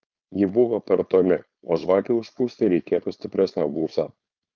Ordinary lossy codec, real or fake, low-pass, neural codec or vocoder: Opus, 24 kbps; fake; 7.2 kHz; codec, 16 kHz, 4.8 kbps, FACodec